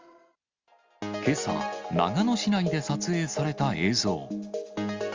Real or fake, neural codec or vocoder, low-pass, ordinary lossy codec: real; none; 7.2 kHz; Opus, 32 kbps